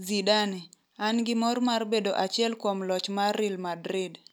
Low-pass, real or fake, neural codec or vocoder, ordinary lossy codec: none; real; none; none